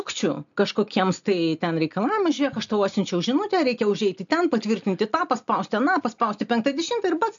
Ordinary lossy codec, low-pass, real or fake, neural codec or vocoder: MP3, 64 kbps; 7.2 kHz; real; none